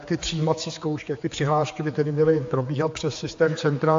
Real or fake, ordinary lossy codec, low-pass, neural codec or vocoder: fake; AAC, 48 kbps; 7.2 kHz; codec, 16 kHz, 4 kbps, X-Codec, HuBERT features, trained on general audio